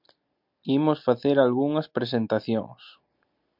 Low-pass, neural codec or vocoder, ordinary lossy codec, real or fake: 5.4 kHz; none; MP3, 48 kbps; real